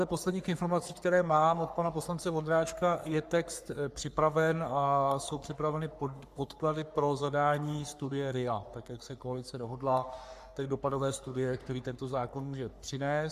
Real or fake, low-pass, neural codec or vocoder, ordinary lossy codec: fake; 14.4 kHz; codec, 44.1 kHz, 3.4 kbps, Pupu-Codec; Opus, 64 kbps